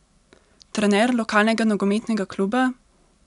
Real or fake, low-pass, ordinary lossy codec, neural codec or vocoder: real; 10.8 kHz; none; none